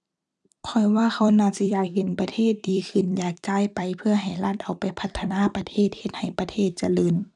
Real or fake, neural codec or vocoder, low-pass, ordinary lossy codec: fake; vocoder, 44.1 kHz, 128 mel bands every 256 samples, BigVGAN v2; 10.8 kHz; none